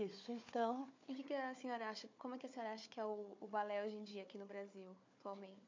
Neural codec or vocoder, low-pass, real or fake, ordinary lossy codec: codec, 16 kHz, 4 kbps, FunCodec, trained on Chinese and English, 50 frames a second; 7.2 kHz; fake; MP3, 48 kbps